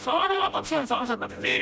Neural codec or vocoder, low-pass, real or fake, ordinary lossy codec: codec, 16 kHz, 0.5 kbps, FreqCodec, smaller model; none; fake; none